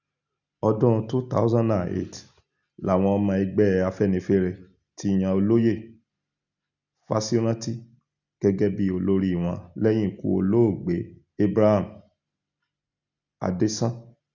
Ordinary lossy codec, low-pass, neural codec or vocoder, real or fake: none; 7.2 kHz; none; real